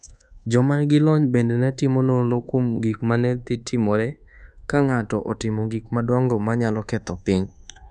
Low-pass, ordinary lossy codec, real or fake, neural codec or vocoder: 10.8 kHz; none; fake; codec, 24 kHz, 1.2 kbps, DualCodec